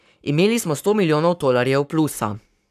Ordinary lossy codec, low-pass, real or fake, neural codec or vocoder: none; 14.4 kHz; fake; codec, 44.1 kHz, 7.8 kbps, Pupu-Codec